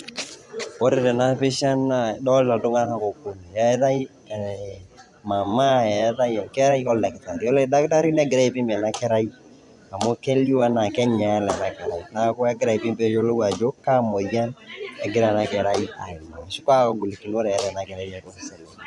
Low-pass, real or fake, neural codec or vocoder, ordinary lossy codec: 10.8 kHz; fake; vocoder, 24 kHz, 100 mel bands, Vocos; none